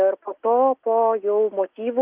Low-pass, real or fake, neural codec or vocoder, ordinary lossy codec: 3.6 kHz; real; none; Opus, 32 kbps